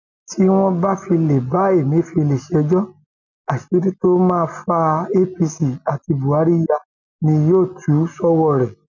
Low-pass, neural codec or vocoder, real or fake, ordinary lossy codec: 7.2 kHz; none; real; none